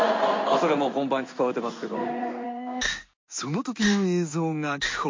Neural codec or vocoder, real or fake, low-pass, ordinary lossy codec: codec, 16 kHz in and 24 kHz out, 1 kbps, XY-Tokenizer; fake; 7.2 kHz; MP3, 48 kbps